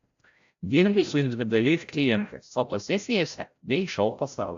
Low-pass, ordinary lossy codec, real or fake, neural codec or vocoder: 7.2 kHz; AAC, 64 kbps; fake; codec, 16 kHz, 0.5 kbps, FreqCodec, larger model